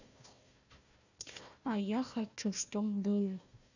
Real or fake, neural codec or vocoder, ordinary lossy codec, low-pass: fake; codec, 16 kHz, 1 kbps, FunCodec, trained on Chinese and English, 50 frames a second; none; 7.2 kHz